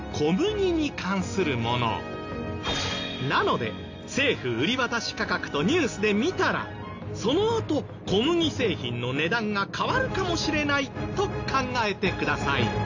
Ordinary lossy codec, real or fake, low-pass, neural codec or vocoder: AAC, 48 kbps; real; 7.2 kHz; none